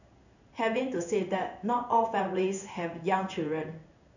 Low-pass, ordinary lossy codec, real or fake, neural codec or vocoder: 7.2 kHz; none; fake; codec, 16 kHz in and 24 kHz out, 1 kbps, XY-Tokenizer